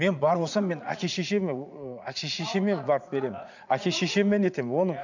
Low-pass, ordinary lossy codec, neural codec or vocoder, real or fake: 7.2 kHz; none; vocoder, 44.1 kHz, 80 mel bands, Vocos; fake